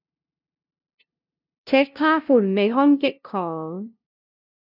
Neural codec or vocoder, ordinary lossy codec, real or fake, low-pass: codec, 16 kHz, 0.5 kbps, FunCodec, trained on LibriTTS, 25 frames a second; none; fake; 5.4 kHz